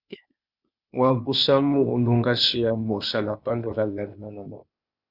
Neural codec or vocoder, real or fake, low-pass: codec, 16 kHz, 0.8 kbps, ZipCodec; fake; 5.4 kHz